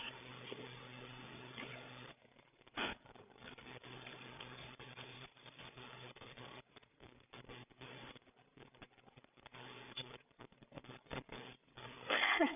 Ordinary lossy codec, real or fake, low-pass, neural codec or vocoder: none; fake; 3.6 kHz; codec, 16 kHz, 8 kbps, FreqCodec, larger model